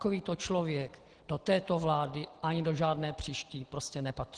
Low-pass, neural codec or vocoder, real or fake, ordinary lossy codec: 9.9 kHz; none; real; Opus, 16 kbps